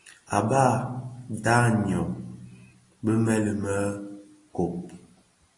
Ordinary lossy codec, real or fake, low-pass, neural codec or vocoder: AAC, 32 kbps; real; 10.8 kHz; none